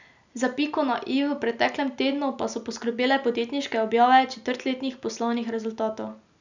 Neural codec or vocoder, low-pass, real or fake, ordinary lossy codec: none; 7.2 kHz; real; none